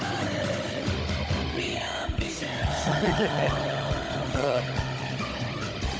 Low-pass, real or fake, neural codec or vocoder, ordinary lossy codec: none; fake; codec, 16 kHz, 16 kbps, FunCodec, trained on Chinese and English, 50 frames a second; none